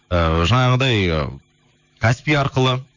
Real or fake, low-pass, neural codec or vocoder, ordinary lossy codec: fake; 7.2 kHz; autoencoder, 48 kHz, 128 numbers a frame, DAC-VAE, trained on Japanese speech; none